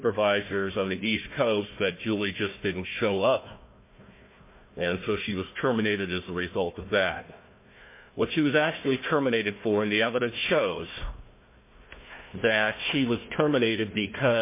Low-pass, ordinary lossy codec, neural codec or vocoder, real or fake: 3.6 kHz; MP3, 24 kbps; codec, 16 kHz, 1 kbps, FunCodec, trained on Chinese and English, 50 frames a second; fake